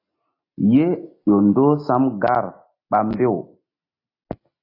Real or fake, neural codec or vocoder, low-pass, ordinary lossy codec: real; none; 5.4 kHz; AAC, 32 kbps